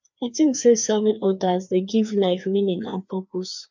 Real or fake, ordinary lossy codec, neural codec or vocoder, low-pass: fake; none; codec, 16 kHz, 2 kbps, FreqCodec, larger model; 7.2 kHz